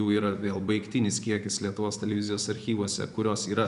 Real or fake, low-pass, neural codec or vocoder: fake; 10.8 kHz; vocoder, 24 kHz, 100 mel bands, Vocos